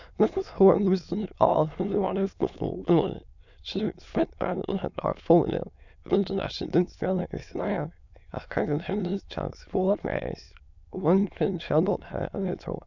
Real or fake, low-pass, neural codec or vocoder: fake; 7.2 kHz; autoencoder, 22.05 kHz, a latent of 192 numbers a frame, VITS, trained on many speakers